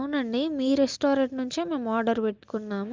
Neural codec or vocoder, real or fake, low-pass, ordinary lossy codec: none; real; 7.2 kHz; Opus, 24 kbps